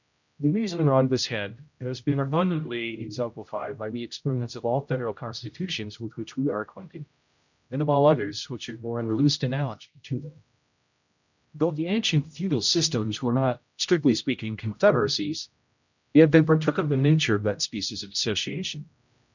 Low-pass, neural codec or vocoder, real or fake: 7.2 kHz; codec, 16 kHz, 0.5 kbps, X-Codec, HuBERT features, trained on general audio; fake